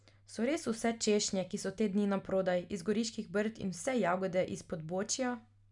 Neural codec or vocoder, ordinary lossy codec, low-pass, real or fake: none; none; 10.8 kHz; real